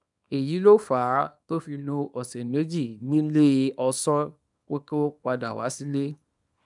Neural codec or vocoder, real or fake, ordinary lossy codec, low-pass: codec, 24 kHz, 0.9 kbps, WavTokenizer, small release; fake; none; 10.8 kHz